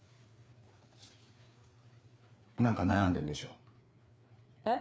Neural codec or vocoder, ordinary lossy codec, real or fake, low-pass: codec, 16 kHz, 4 kbps, FreqCodec, larger model; none; fake; none